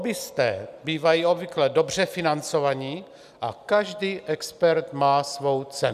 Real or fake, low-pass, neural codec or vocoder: real; 14.4 kHz; none